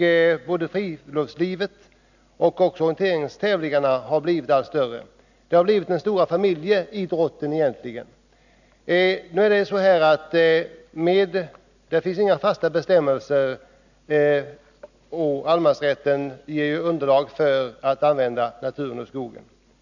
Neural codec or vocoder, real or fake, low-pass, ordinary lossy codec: none; real; 7.2 kHz; none